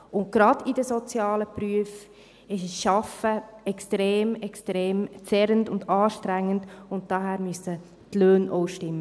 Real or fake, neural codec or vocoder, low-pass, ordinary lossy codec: real; none; none; none